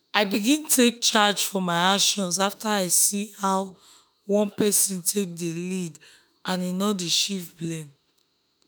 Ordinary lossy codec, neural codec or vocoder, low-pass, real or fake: none; autoencoder, 48 kHz, 32 numbers a frame, DAC-VAE, trained on Japanese speech; none; fake